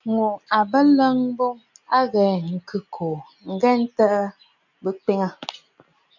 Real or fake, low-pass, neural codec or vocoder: real; 7.2 kHz; none